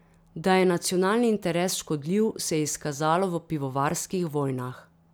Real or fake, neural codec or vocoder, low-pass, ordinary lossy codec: real; none; none; none